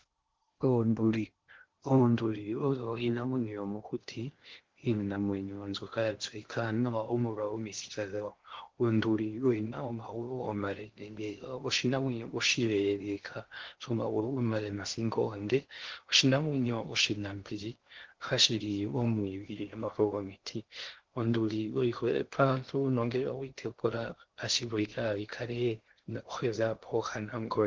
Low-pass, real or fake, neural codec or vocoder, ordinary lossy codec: 7.2 kHz; fake; codec, 16 kHz in and 24 kHz out, 0.6 kbps, FocalCodec, streaming, 2048 codes; Opus, 16 kbps